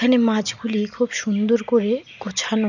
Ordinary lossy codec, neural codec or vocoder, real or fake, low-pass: none; none; real; 7.2 kHz